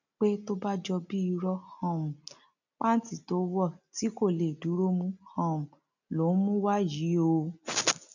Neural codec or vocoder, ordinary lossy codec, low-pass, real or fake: none; none; 7.2 kHz; real